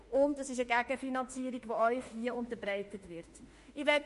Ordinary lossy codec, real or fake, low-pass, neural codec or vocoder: MP3, 48 kbps; fake; 14.4 kHz; autoencoder, 48 kHz, 32 numbers a frame, DAC-VAE, trained on Japanese speech